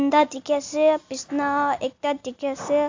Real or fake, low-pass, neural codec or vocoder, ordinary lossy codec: real; 7.2 kHz; none; AAC, 48 kbps